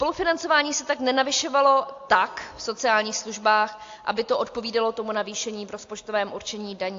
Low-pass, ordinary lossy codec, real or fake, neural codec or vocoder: 7.2 kHz; AAC, 48 kbps; real; none